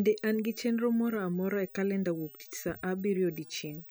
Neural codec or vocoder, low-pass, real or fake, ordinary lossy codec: none; none; real; none